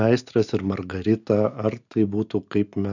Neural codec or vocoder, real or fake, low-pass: none; real; 7.2 kHz